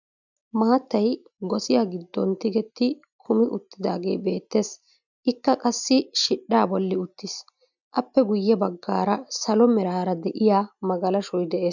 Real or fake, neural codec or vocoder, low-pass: real; none; 7.2 kHz